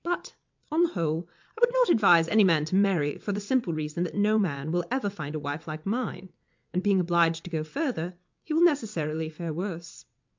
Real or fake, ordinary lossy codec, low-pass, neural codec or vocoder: fake; MP3, 64 kbps; 7.2 kHz; vocoder, 22.05 kHz, 80 mel bands, WaveNeXt